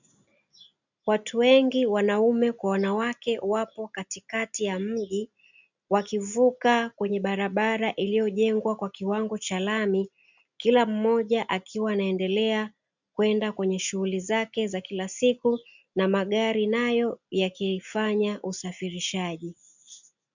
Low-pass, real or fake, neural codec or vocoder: 7.2 kHz; real; none